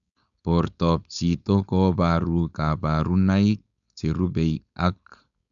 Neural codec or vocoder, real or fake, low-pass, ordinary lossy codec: codec, 16 kHz, 4.8 kbps, FACodec; fake; 7.2 kHz; none